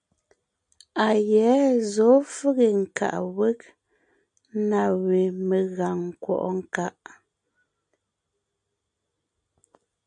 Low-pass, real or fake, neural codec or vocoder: 9.9 kHz; real; none